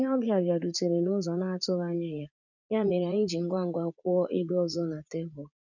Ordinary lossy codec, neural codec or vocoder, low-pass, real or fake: none; vocoder, 44.1 kHz, 80 mel bands, Vocos; 7.2 kHz; fake